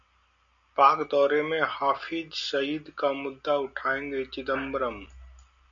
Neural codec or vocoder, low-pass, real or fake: none; 7.2 kHz; real